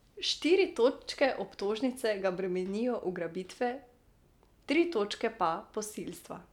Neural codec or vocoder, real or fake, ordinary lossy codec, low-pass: none; real; none; 19.8 kHz